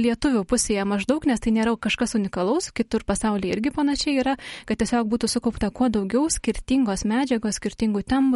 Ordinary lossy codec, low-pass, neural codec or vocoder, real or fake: MP3, 48 kbps; 19.8 kHz; none; real